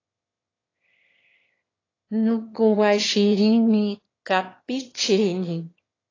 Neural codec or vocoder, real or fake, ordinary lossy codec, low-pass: autoencoder, 22.05 kHz, a latent of 192 numbers a frame, VITS, trained on one speaker; fake; AAC, 32 kbps; 7.2 kHz